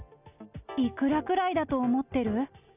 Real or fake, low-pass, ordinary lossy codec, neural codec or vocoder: real; 3.6 kHz; none; none